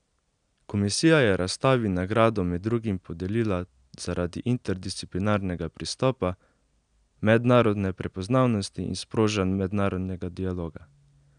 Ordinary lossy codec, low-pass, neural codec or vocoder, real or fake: none; 9.9 kHz; none; real